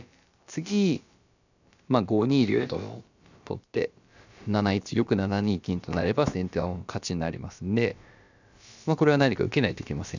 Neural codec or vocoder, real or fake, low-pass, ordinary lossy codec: codec, 16 kHz, about 1 kbps, DyCAST, with the encoder's durations; fake; 7.2 kHz; none